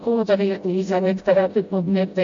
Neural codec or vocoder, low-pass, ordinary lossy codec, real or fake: codec, 16 kHz, 0.5 kbps, FreqCodec, smaller model; 7.2 kHz; MP3, 48 kbps; fake